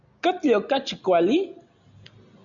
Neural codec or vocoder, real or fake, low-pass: none; real; 7.2 kHz